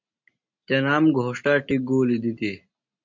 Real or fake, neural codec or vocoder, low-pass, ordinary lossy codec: real; none; 7.2 kHz; MP3, 64 kbps